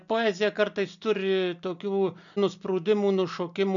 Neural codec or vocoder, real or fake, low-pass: none; real; 7.2 kHz